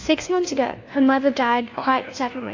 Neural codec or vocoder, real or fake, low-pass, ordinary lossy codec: codec, 16 kHz, 1 kbps, FunCodec, trained on LibriTTS, 50 frames a second; fake; 7.2 kHz; AAC, 48 kbps